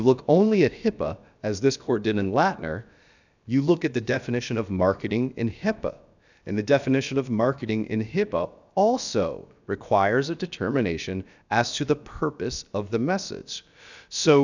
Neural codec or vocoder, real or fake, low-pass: codec, 16 kHz, about 1 kbps, DyCAST, with the encoder's durations; fake; 7.2 kHz